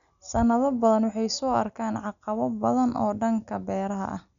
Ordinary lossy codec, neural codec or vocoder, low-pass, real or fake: none; none; 7.2 kHz; real